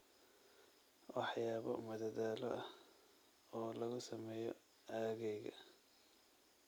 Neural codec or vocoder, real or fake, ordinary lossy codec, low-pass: none; real; none; none